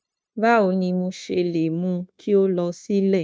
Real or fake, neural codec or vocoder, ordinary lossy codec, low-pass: fake; codec, 16 kHz, 0.9 kbps, LongCat-Audio-Codec; none; none